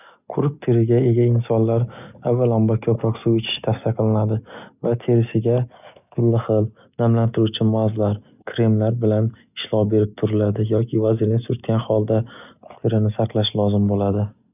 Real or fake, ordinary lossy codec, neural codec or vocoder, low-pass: real; none; none; 3.6 kHz